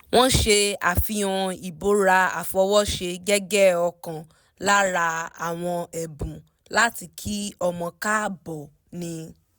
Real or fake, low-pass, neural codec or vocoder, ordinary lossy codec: real; none; none; none